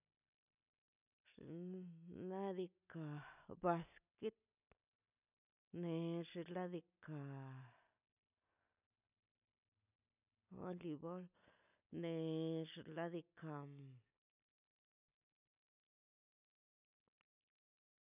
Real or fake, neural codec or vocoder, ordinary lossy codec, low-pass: real; none; none; 3.6 kHz